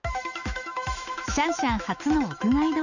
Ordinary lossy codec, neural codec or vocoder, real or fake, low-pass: none; autoencoder, 48 kHz, 128 numbers a frame, DAC-VAE, trained on Japanese speech; fake; 7.2 kHz